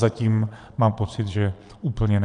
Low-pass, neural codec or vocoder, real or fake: 9.9 kHz; vocoder, 22.05 kHz, 80 mel bands, Vocos; fake